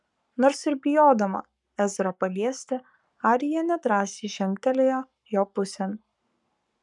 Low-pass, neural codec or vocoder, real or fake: 10.8 kHz; codec, 44.1 kHz, 7.8 kbps, Pupu-Codec; fake